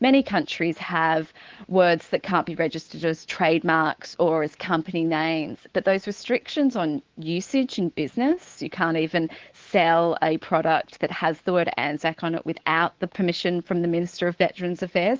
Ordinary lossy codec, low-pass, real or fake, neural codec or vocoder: Opus, 16 kbps; 7.2 kHz; fake; codec, 24 kHz, 3.1 kbps, DualCodec